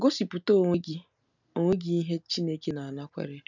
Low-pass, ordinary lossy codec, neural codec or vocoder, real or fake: 7.2 kHz; none; none; real